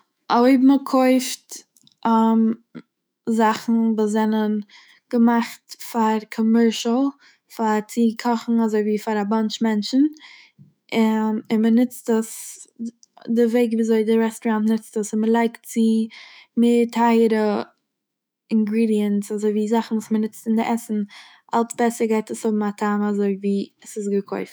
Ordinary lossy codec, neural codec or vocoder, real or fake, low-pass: none; autoencoder, 48 kHz, 128 numbers a frame, DAC-VAE, trained on Japanese speech; fake; none